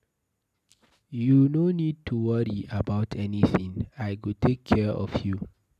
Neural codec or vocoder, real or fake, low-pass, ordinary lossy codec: none; real; 14.4 kHz; none